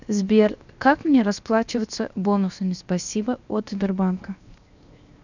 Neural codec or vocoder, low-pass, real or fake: codec, 16 kHz, 0.7 kbps, FocalCodec; 7.2 kHz; fake